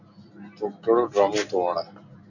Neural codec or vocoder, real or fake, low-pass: none; real; 7.2 kHz